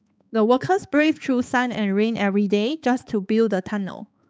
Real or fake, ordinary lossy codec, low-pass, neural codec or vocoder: fake; none; none; codec, 16 kHz, 4 kbps, X-Codec, HuBERT features, trained on balanced general audio